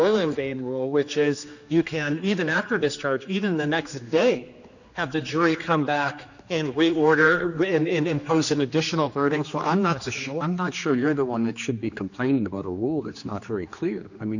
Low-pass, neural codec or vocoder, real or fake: 7.2 kHz; codec, 16 kHz, 2 kbps, X-Codec, HuBERT features, trained on general audio; fake